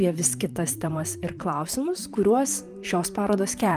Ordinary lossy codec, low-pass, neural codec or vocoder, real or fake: Opus, 32 kbps; 14.4 kHz; vocoder, 44.1 kHz, 128 mel bands, Pupu-Vocoder; fake